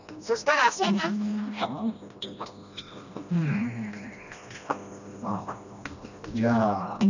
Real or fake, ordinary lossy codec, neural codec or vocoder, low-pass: fake; none; codec, 16 kHz, 1 kbps, FreqCodec, smaller model; 7.2 kHz